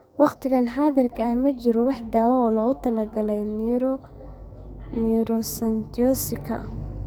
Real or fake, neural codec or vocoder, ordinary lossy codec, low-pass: fake; codec, 44.1 kHz, 2.6 kbps, SNAC; none; none